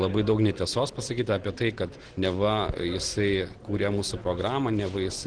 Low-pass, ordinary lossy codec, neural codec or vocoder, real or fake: 9.9 kHz; Opus, 16 kbps; none; real